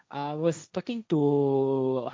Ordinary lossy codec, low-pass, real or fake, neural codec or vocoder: none; none; fake; codec, 16 kHz, 1.1 kbps, Voila-Tokenizer